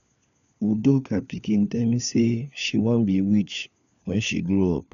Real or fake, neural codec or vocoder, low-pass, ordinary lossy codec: fake; codec, 16 kHz, 4 kbps, FunCodec, trained on LibriTTS, 50 frames a second; 7.2 kHz; none